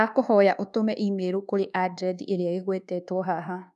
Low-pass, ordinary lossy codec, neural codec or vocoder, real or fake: 10.8 kHz; none; codec, 24 kHz, 1.2 kbps, DualCodec; fake